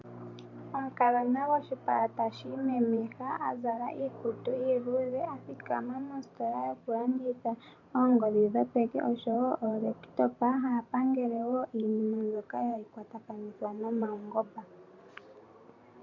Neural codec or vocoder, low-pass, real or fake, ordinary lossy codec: vocoder, 44.1 kHz, 128 mel bands every 512 samples, BigVGAN v2; 7.2 kHz; fake; MP3, 64 kbps